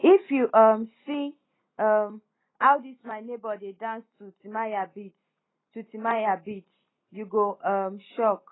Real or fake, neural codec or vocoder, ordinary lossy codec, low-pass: real; none; AAC, 16 kbps; 7.2 kHz